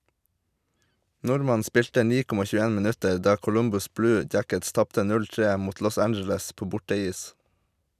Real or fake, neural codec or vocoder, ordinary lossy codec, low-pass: real; none; none; 14.4 kHz